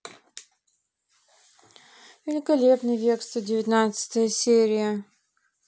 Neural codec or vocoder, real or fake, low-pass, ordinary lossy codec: none; real; none; none